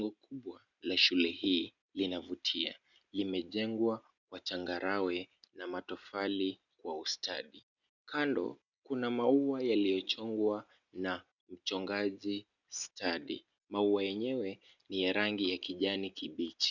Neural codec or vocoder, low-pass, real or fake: none; 7.2 kHz; real